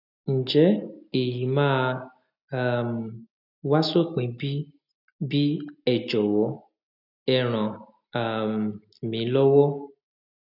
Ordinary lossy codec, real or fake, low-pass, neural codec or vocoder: none; real; 5.4 kHz; none